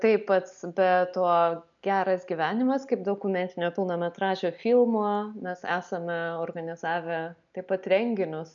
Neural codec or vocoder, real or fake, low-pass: none; real; 7.2 kHz